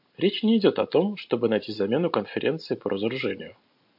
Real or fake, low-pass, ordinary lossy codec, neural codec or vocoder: real; 5.4 kHz; MP3, 48 kbps; none